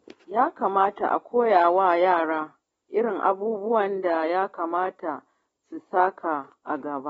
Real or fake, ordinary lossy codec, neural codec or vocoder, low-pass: real; AAC, 24 kbps; none; 19.8 kHz